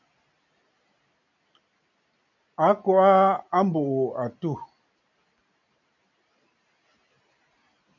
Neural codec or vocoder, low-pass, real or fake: none; 7.2 kHz; real